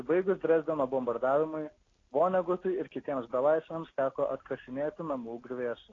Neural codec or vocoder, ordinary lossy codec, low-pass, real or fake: none; AAC, 32 kbps; 7.2 kHz; real